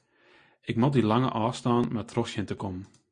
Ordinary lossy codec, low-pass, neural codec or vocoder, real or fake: MP3, 48 kbps; 9.9 kHz; none; real